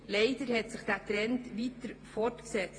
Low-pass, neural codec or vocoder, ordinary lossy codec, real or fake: 9.9 kHz; vocoder, 48 kHz, 128 mel bands, Vocos; AAC, 32 kbps; fake